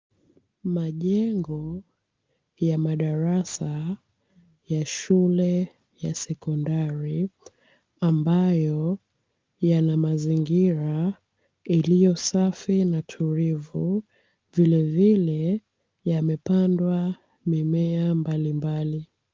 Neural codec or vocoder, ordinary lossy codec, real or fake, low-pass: none; Opus, 24 kbps; real; 7.2 kHz